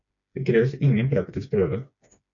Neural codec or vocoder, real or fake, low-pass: codec, 16 kHz, 2 kbps, FreqCodec, smaller model; fake; 7.2 kHz